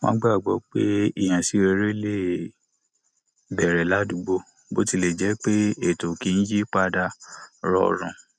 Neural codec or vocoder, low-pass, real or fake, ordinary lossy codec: none; none; real; none